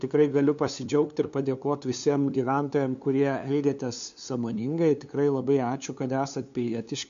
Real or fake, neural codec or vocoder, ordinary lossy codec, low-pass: fake; codec, 16 kHz, 2 kbps, FunCodec, trained on LibriTTS, 25 frames a second; MP3, 64 kbps; 7.2 kHz